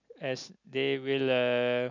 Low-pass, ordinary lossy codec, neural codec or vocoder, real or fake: 7.2 kHz; none; none; real